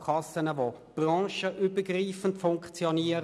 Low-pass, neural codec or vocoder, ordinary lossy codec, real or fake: none; none; none; real